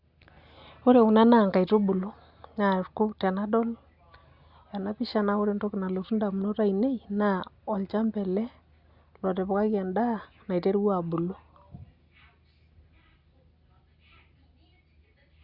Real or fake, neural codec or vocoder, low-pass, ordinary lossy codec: real; none; 5.4 kHz; Opus, 64 kbps